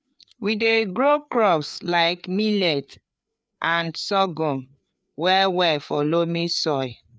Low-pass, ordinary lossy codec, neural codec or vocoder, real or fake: none; none; codec, 16 kHz, 4 kbps, FreqCodec, larger model; fake